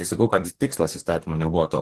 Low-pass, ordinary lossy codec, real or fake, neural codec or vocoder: 14.4 kHz; Opus, 16 kbps; fake; codec, 44.1 kHz, 2.6 kbps, DAC